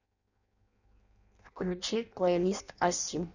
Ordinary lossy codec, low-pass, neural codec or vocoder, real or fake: none; 7.2 kHz; codec, 16 kHz in and 24 kHz out, 0.6 kbps, FireRedTTS-2 codec; fake